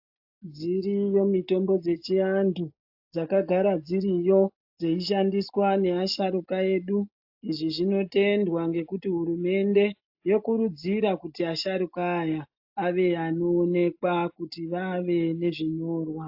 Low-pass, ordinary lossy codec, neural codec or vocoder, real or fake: 5.4 kHz; AAC, 48 kbps; none; real